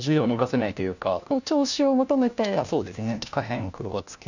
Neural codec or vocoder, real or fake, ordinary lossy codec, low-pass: codec, 16 kHz, 1 kbps, FunCodec, trained on LibriTTS, 50 frames a second; fake; none; 7.2 kHz